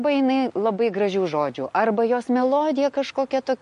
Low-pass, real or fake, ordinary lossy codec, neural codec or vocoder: 14.4 kHz; real; MP3, 48 kbps; none